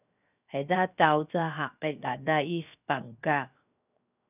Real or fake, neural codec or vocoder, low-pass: fake; codec, 16 kHz, 0.7 kbps, FocalCodec; 3.6 kHz